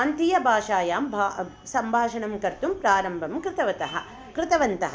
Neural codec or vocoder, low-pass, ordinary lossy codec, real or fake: none; none; none; real